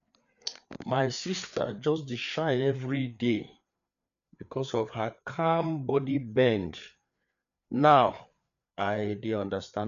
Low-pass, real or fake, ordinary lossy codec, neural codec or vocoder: 7.2 kHz; fake; none; codec, 16 kHz, 4 kbps, FreqCodec, larger model